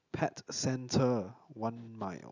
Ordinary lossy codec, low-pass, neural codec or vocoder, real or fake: none; 7.2 kHz; none; real